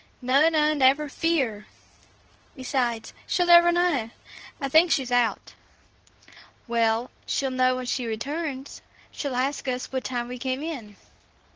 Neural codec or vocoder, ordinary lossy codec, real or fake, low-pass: codec, 24 kHz, 0.9 kbps, WavTokenizer, medium speech release version 2; Opus, 16 kbps; fake; 7.2 kHz